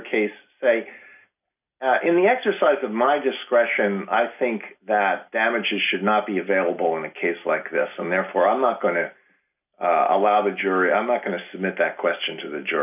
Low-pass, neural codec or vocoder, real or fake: 3.6 kHz; none; real